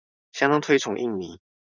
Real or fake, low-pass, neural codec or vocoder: fake; 7.2 kHz; vocoder, 44.1 kHz, 128 mel bands every 512 samples, BigVGAN v2